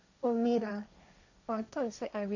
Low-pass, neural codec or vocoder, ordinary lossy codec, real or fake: 7.2 kHz; codec, 16 kHz, 1.1 kbps, Voila-Tokenizer; none; fake